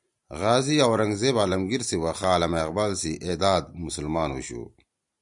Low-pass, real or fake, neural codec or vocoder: 10.8 kHz; real; none